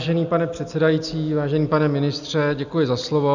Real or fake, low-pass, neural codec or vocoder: real; 7.2 kHz; none